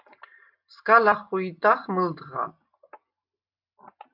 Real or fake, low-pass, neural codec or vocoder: real; 5.4 kHz; none